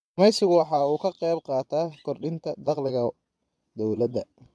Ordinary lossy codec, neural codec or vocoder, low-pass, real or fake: none; vocoder, 22.05 kHz, 80 mel bands, Vocos; none; fake